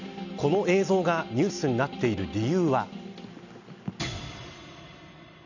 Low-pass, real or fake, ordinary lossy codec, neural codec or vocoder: 7.2 kHz; real; none; none